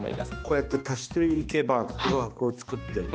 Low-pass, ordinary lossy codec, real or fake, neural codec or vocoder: none; none; fake; codec, 16 kHz, 2 kbps, X-Codec, HuBERT features, trained on balanced general audio